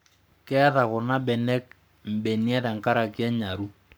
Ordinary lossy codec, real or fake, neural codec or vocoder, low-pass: none; fake; codec, 44.1 kHz, 7.8 kbps, Pupu-Codec; none